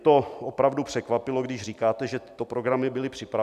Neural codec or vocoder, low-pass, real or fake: vocoder, 44.1 kHz, 128 mel bands every 256 samples, BigVGAN v2; 14.4 kHz; fake